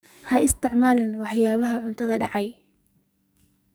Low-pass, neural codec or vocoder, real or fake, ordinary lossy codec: none; codec, 44.1 kHz, 2.6 kbps, SNAC; fake; none